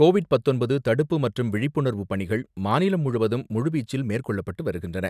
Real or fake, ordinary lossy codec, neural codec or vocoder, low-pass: real; none; none; 14.4 kHz